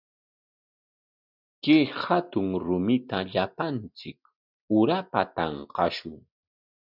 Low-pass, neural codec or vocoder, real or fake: 5.4 kHz; none; real